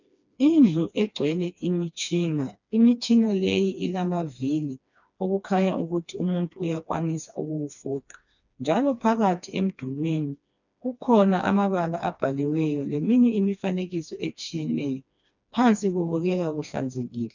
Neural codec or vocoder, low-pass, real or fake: codec, 16 kHz, 2 kbps, FreqCodec, smaller model; 7.2 kHz; fake